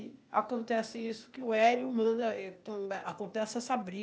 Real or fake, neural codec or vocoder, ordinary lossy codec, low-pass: fake; codec, 16 kHz, 0.8 kbps, ZipCodec; none; none